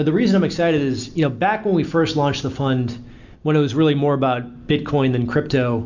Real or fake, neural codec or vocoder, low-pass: real; none; 7.2 kHz